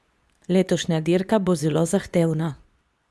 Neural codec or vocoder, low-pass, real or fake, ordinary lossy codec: codec, 24 kHz, 0.9 kbps, WavTokenizer, medium speech release version 2; none; fake; none